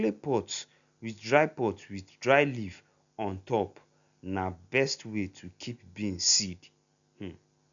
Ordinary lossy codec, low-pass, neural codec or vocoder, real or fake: none; 7.2 kHz; none; real